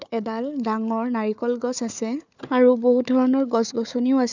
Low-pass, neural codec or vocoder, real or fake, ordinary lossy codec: 7.2 kHz; codec, 16 kHz, 4 kbps, FunCodec, trained on Chinese and English, 50 frames a second; fake; none